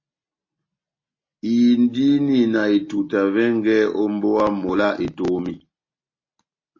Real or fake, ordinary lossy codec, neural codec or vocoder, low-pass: real; MP3, 32 kbps; none; 7.2 kHz